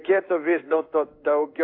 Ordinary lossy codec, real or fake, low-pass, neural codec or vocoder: AAC, 32 kbps; fake; 5.4 kHz; codec, 16 kHz in and 24 kHz out, 1 kbps, XY-Tokenizer